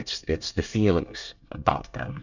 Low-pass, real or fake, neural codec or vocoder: 7.2 kHz; fake; codec, 24 kHz, 1 kbps, SNAC